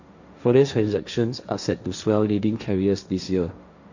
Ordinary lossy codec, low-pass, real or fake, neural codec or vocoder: none; 7.2 kHz; fake; codec, 16 kHz, 1.1 kbps, Voila-Tokenizer